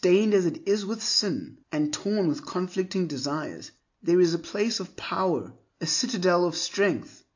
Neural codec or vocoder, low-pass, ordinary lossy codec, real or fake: none; 7.2 kHz; AAC, 48 kbps; real